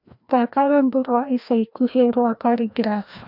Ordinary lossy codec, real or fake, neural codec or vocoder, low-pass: none; fake; codec, 16 kHz, 1 kbps, FreqCodec, larger model; 5.4 kHz